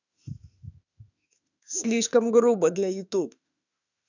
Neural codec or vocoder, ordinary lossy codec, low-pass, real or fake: autoencoder, 48 kHz, 32 numbers a frame, DAC-VAE, trained on Japanese speech; none; 7.2 kHz; fake